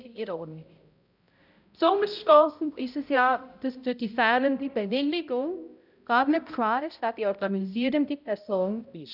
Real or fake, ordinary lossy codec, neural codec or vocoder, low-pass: fake; none; codec, 16 kHz, 0.5 kbps, X-Codec, HuBERT features, trained on balanced general audio; 5.4 kHz